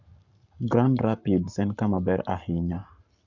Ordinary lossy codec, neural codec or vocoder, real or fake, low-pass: none; codec, 44.1 kHz, 7.8 kbps, Pupu-Codec; fake; 7.2 kHz